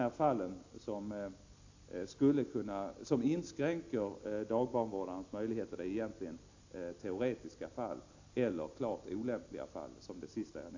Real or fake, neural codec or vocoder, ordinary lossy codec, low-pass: real; none; AAC, 48 kbps; 7.2 kHz